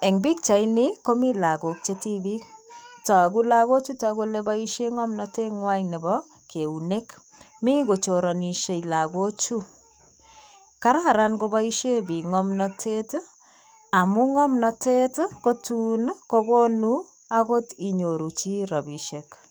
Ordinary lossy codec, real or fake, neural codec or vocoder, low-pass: none; fake; codec, 44.1 kHz, 7.8 kbps, DAC; none